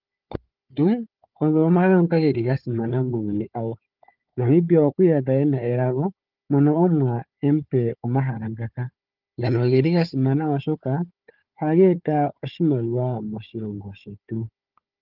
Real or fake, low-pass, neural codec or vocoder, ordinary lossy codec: fake; 5.4 kHz; codec, 16 kHz, 4 kbps, FunCodec, trained on Chinese and English, 50 frames a second; Opus, 24 kbps